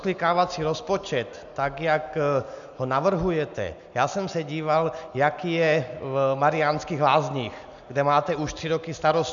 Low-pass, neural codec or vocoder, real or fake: 7.2 kHz; none; real